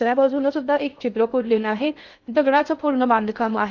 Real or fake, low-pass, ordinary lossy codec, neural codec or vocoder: fake; 7.2 kHz; none; codec, 16 kHz in and 24 kHz out, 0.6 kbps, FocalCodec, streaming, 2048 codes